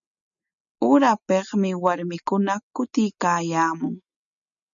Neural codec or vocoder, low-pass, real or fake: none; 7.2 kHz; real